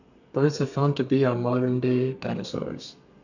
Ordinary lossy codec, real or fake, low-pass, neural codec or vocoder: none; fake; 7.2 kHz; codec, 32 kHz, 1.9 kbps, SNAC